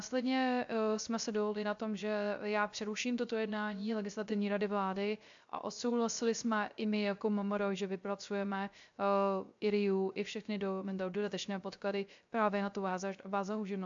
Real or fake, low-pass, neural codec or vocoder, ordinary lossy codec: fake; 7.2 kHz; codec, 16 kHz, 0.3 kbps, FocalCodec; AAC, 64 kbps